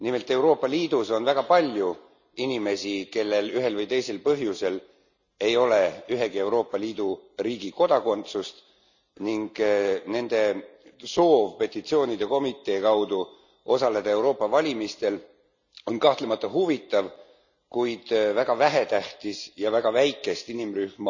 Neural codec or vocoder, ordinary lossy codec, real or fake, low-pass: none; none; real; 7.2 kHz